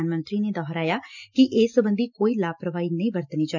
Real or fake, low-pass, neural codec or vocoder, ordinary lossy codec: real; 7.2 kHz; none; none